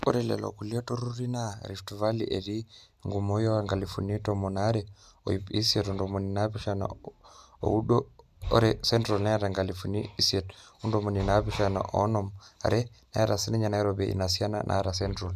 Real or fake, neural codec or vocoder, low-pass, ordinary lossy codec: real; none; 14.4 kHz; none